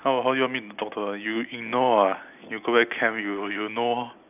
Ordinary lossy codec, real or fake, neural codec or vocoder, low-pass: none; real; none; 3.6 kHz